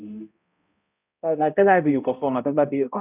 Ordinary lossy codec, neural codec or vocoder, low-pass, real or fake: none; codec, 16 kHz, 0.5 kbps, X-Codec, HuBERT features, trained on balanced general audio; 3.6 kHz; fake